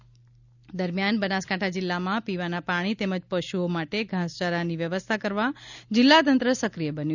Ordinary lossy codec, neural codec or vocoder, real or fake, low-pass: none; none; real; 7.2 kHz